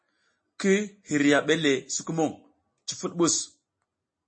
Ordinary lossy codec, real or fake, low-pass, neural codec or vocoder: MP3, 32 kbps; real; 9.9 kHz; none